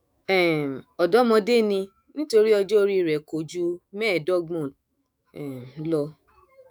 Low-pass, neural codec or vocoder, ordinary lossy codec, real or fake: none; autoencoder, 48 kHz, 128 numbers a frame, DAC-VAE, trained on Japanese speech; none; fake